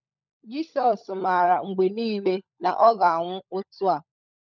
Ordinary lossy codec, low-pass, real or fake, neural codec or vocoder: none; 7.2 kHz; fake; codec, 16 kHz, 16 kbps, FunCodec, trained on LibriTTS, 50 frames a second